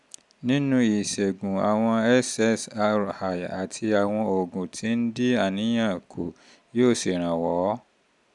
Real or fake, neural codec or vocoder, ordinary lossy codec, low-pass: real; none; none; 10.8 kHz